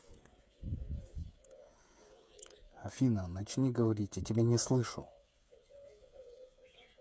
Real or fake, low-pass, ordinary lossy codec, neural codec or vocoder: fake; none; none; codec, 16 kHz, 8 kbps, FreqCodec, smaller model